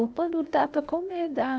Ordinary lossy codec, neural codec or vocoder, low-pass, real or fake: none; codec, 16 kHz, 0.8 kbps, ZipCodec; none; fake